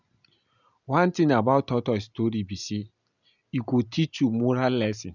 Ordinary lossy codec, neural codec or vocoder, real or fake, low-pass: none; none; real; 7.2 kHz